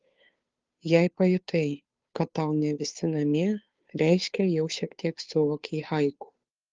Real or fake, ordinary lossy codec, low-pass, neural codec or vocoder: fake; Opus, 32 kbps; 7.2 kHz; codec, 16 kHz, 2 kbps, FunCodec, trained on Chinese and English, 25 frames a second